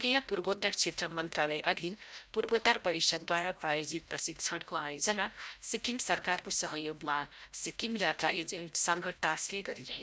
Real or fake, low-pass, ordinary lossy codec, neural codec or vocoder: fake; none; none; codec, 16 kHz, 0.5 kbps, FreqCodec, larger model